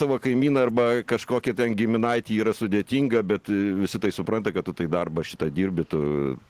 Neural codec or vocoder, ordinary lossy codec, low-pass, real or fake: none; Opus, 16 kbps; 14.4 kHz; real